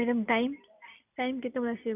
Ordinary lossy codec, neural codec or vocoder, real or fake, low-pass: none; none; real; 3.6 kHz